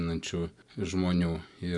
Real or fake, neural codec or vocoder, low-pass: real; none; 10.8 kHz